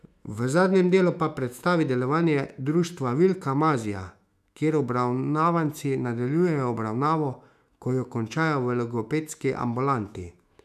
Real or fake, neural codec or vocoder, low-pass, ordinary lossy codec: fake; autoencoder, 48 kHz, 128 numbers a frame, DAC-VAE, trained on Japanese speech; 14.4 kHz; none